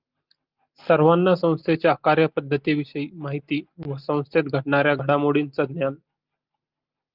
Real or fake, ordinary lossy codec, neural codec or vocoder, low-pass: real; Opus, 24 kbps; none; 5.4 kHz